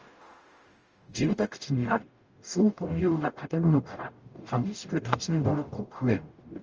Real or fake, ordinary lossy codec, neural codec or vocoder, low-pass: fake; Opus, 24 kbps; codec, 44.1 kHz, 0.9 kbps, DAC; 7.2 kHz